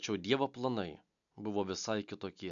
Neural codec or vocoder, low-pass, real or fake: none; 7.2 kHz; real